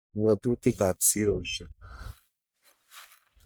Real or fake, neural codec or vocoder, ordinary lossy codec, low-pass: fake; codec, 44.1 kHz, 1.7 kbps, Pupu-Codec; none; none